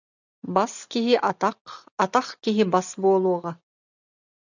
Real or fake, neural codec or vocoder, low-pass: real; none; 7.2 kHz